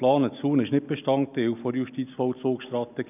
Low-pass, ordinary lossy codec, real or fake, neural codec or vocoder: 3.6 kHz; none; real; none